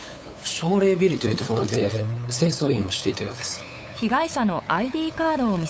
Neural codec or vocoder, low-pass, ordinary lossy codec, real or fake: codec, 16 kHz, 8 kbps, FunCodec, trained on LibriTTS, 25 frames a second; none; none; fake